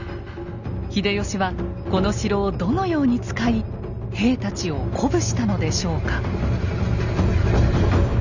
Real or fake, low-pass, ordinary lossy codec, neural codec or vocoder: real; 7.2 kHz; none; none